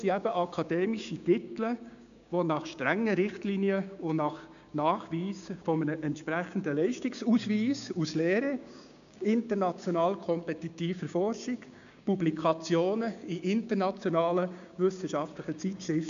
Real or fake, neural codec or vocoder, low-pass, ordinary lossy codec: fake; codec, 16 kHz, 6 kbps, DAC; 7.2 kHz; AAC, 96 kbps